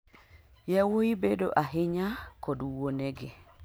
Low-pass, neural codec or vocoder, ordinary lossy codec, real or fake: none; none; none; real